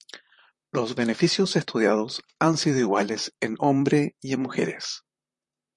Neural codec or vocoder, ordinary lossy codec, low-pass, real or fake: vocoder, 44.1 kHz, 128 mel bands, Pupu-Vocoder; MP3, 48 kbps; 10.8 kHz; fake